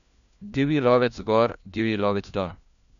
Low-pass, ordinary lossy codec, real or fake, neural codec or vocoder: 7.2 kHz; none; fake; codec, 16 kHz, 1 kbps, FunCodec, trained on LibriTTS, 50 frames a second